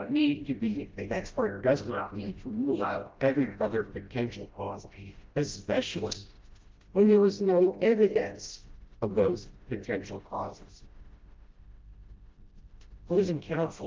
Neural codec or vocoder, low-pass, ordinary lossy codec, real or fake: codec, 16 kHz, 0.5 kbps, FreqCodec, smaller model; 7.2 kHz; Opus, 32 kbps; fake